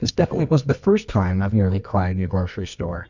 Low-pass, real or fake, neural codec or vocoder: 7.2 kHz; fake; codec, 24 kHz, 0.9 kbps, WavTokenizer, medium music audio release